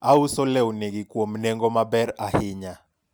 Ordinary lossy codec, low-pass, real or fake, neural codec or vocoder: none; none; real; none